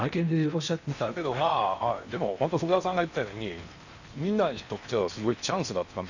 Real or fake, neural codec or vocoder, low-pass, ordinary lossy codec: fake; codec, 16 kHz in and 24 kHz out, 0.8 kbps, FocalCodec, streaming, 65536 codes; 7.2 kHz; none